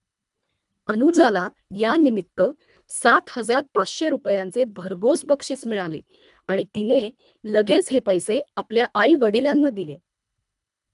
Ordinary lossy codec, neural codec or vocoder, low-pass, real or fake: none; codec, 24 kHz, 1.5 kbps, HILCodec; 10.8 kHz; fake